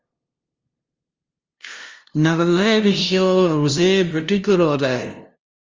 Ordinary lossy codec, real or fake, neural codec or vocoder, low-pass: Opus, 32 kbps; fake; codec, 16 kHz, 0.5 kbps, FunCodec, trained on LibriTTS, 25 frames a second; 7.2 kHz